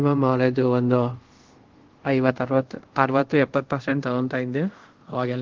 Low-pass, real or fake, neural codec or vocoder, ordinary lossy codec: 7.2 kHz; fake; codec, 24 kHz, 0.5 kbps, DualCodec; Opus, 16 kbps